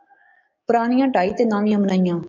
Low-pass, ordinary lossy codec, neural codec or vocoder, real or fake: 7.2 kHz; MP3, 64 kbps; codec, 44.1 kHz, 7.8 kbps, DAC; fake